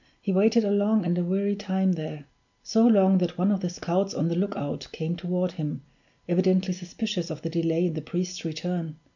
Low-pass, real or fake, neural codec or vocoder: 7.2 kHz; real; none